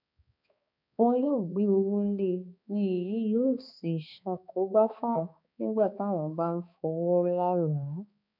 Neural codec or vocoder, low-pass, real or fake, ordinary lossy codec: codec, 16 kHz, 2 kbps, X-Codec, HuBERT features, trained on balanced general audio; 5.4 kHz; fake; none